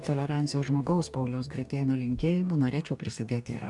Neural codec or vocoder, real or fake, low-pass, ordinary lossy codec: codec, 44.1 kHz, 2.6 kbps, DAC; fake; 10.8 kHz; MP3, 96 kbps